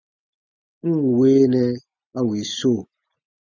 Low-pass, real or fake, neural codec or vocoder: 7.2 kHz; real; none